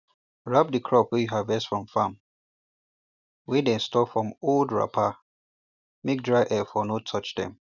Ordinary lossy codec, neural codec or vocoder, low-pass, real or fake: none; none; 7.2 kHz; real